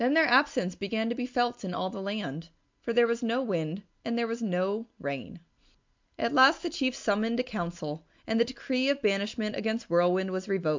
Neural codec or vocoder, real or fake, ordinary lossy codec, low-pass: none; real; MP3, 64 kbps; 7.2 kHz